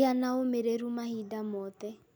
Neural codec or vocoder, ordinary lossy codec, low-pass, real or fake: none; none; none; real